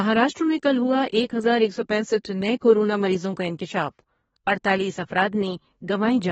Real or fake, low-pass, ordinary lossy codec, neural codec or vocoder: fake; 14.4 kHz; AAC, 24 kbps; codec, 32 kHz, 1.9 kbps, SNAC